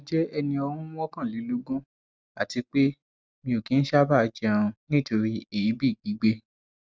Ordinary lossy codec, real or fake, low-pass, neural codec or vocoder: none; real; none; none